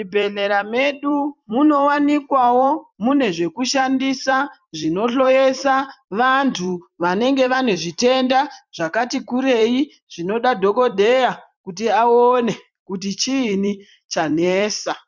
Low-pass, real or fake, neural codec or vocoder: 7.2 kHz; fake; vocoder, 44.1 kHz, 128 mel bands every 256 samples, BigVGAN v2